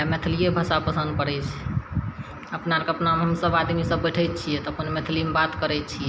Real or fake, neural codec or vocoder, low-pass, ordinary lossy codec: real; none; none; none